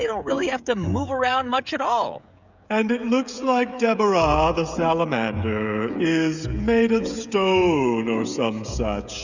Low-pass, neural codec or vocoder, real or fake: 7.2 kHz; codec, 16 kHz, 16 kbps, FreqCodec, smaller model; fake